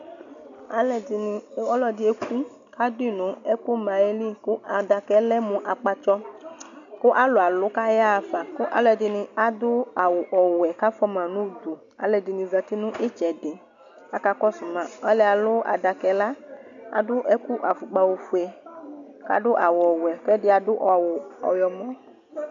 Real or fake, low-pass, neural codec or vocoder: real; 7.2 kHz; none